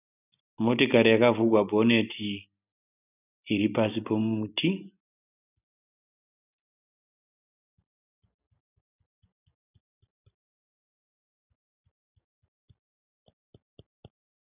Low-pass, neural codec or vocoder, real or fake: 3.6 kHz; none; real